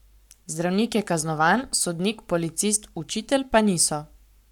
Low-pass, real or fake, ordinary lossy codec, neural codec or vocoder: 19.8 kHz; fake; none; codec, 44.1 kHz, 7.8 kbps, Pupu-Codec